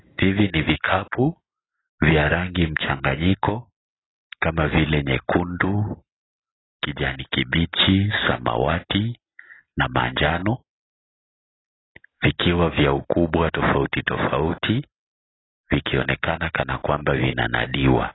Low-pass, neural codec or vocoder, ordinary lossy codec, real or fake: 7.2 kHz; none; AAC, 16 kbps; real